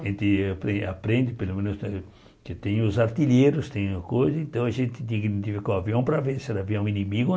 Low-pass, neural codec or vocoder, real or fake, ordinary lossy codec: none; none; real; none